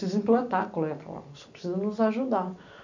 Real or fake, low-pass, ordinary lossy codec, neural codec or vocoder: real; 7.2 kHz; none; none